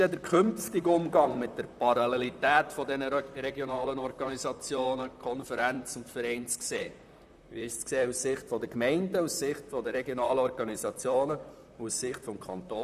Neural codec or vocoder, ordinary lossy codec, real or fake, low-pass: vocoder, 44.1 kHz, 128 mel bands, Pupu-Vocoder; none; fake; 14.4 kHz